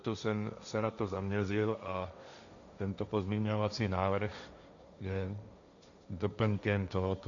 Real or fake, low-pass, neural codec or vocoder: fake; 7.2 kHz; codec, 16 kHz, 1.1 kbps, Voila-Tokenizer